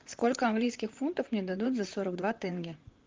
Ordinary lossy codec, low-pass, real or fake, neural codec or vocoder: Opus, 32 kbps; 7.2 kHz; fake; vocoder, 44.1 kHz, 128 mel bands, Pupu-Vocoder